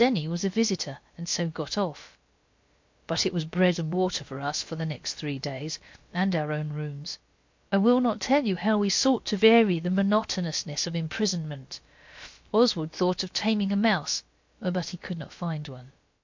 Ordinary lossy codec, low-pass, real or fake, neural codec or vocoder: MP3, 48 kbps; 7.2 kHz; fake; codec, 16 kHz, about 1 kbps, DyCAST, with the encoder's durations